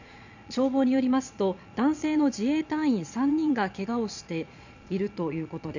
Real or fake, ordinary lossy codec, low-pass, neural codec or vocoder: fake; none; 7.2 kHz; vocoder, 22.05 kHz, 80 mel bands, Vocos